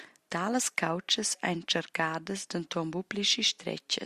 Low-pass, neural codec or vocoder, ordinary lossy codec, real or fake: 14.4 kHz; none; Opus, 64 kbps; real